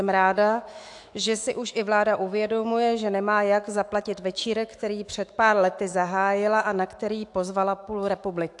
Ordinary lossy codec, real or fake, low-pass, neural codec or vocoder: AAC, 64 kbps; fake; 10.8 kHz; autoencoder, 48 kHz, 128 numbers a frame, DAC-VAE, trained on Japanese speech